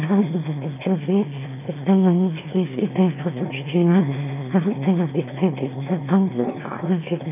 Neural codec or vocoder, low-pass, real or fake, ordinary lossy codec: autoencoder, 22.05 kHz, a latent of 192 numbers a frame, VITS, trained on one speaker; 3.6 kHz; fake; none